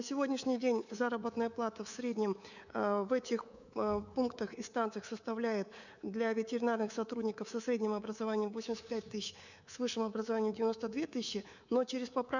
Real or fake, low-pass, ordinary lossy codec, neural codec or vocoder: fake; 7.2 kHz; none; codec, 24 kHz, 3.1 kbps, DualCodec